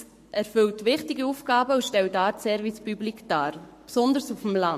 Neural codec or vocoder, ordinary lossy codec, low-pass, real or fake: codec, 44.1 kHz, 7.8 kbps, Pupu-Codec; MP3, 64 kbps; 14.4 kHz; fake